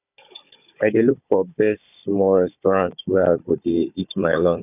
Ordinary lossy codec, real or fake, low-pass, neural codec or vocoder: none; fake; 3.6 kHz; codec, 16 kHz, 16 kbps, FunCodec, trained on Chinese and English, 50 frames a second